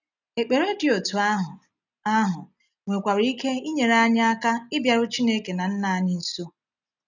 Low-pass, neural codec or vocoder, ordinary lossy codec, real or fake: 7.2 kHz; none; none; real